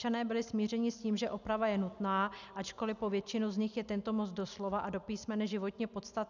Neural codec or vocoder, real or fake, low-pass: none; real; 7.2 kHz